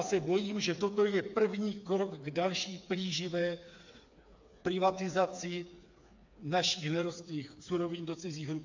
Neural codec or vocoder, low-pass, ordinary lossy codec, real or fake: codec, 16 kHz, 4 kbps, FreqCodec, smaller model; 7.2 kHz; AAC, 48 kbps; fake